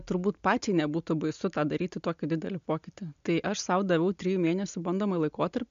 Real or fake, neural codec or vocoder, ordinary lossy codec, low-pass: real; none; MP3, 64 kbps; 7.2 kHz